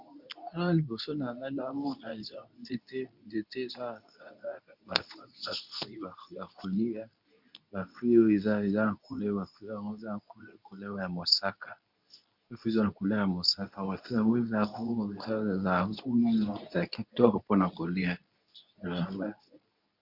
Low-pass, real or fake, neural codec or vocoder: 5.4 kHz; fake; codec, 24 kHz, 0.9 kbps, WavTokenizer, medium speech release version 2